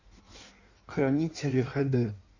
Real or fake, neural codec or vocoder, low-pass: fake; codec, 16 kHz in and 24 kHz out, 1.1 kbps, FireRedTTS-2 codec; 7.2 kHz